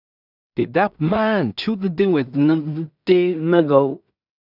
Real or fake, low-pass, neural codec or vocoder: fake; 5.4 kHz; codec, 16 kHz in and 24 kHz out, 0.4 kbps, LongCat-Audio-Codec, two codebook decoder